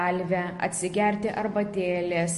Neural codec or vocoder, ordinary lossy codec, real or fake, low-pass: none; MP3, 48 kbps; real; 14.4 kHz